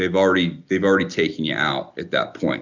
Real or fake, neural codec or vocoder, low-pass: real; none; 7.2 kHz